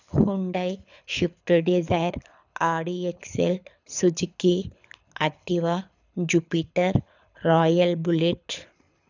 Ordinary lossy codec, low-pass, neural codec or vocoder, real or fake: none; 7.2 kHz; codec, 24 kHz, 6 kbps, HILCodec; fake